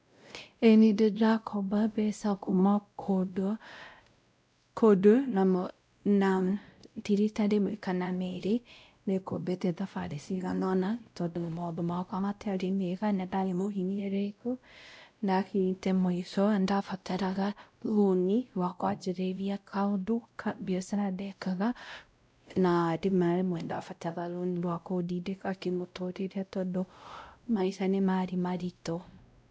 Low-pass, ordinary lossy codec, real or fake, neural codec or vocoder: none; none; fake; codec, 16 kHz, 0.5 kbps, X-Codec, WavLM features, trained on Multilingual LibriSpeech